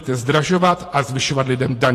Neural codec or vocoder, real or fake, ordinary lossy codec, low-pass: vocoder, 48 kHz, 128 mel bands, Vocos; fake; AAC, 48 kbps; 14.4 kHz